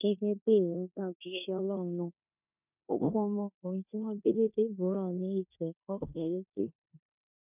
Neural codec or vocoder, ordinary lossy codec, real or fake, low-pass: codec, 16 kHz in and 24 kHz out, 0.9 kbps, LongCat-Audio-Codec, four codebook decoder; none; fake; 3.6 kHz